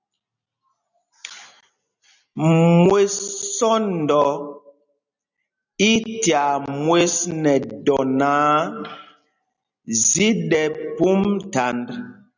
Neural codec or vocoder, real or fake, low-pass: none; real; 7.2 kHz